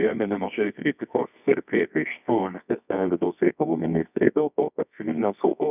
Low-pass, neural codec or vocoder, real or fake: 3.6 kHz; codec, 24 kHz, 0.9 kbps, WavTokenizer, medium music audio release; fake